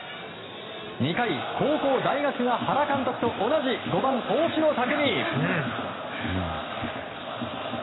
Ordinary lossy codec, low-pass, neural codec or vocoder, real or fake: AAC, 16 kbps; 7.2 kHz; none; real